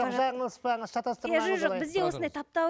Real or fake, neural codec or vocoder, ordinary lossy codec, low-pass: real; none; none; none